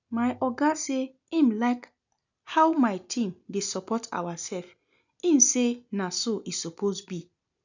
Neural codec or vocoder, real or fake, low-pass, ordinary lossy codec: none; real; 7.2 kHz; none